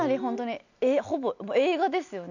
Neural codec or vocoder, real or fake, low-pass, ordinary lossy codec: none; real; 7.2 kHz; none